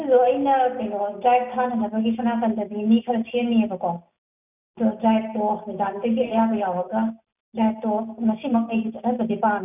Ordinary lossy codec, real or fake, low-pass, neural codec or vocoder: none; real; 3.6 kHz; none